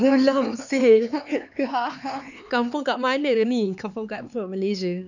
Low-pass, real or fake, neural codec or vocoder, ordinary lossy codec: 7.2 kHz; fake; codec, 16 kHz, 4 kbps, X-Codec, HuBERT features, trained on LibriSpeech; none